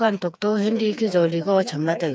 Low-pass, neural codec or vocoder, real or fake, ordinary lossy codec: none; codec, 16 kHz, 4 kbps, FreqCodec, smaller model; fake; none